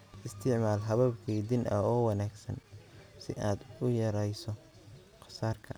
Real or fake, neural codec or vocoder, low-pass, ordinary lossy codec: real; none; none; none